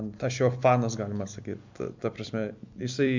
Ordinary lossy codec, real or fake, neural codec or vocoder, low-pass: MP3, 64 kbps; fake; vocoder, 44.1 kHz, 128 mel bands every 256 samples, BigVGAN v2; 7.2 kHz